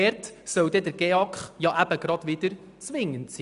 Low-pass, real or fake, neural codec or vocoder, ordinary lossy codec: 10.8 kHz; real; none; none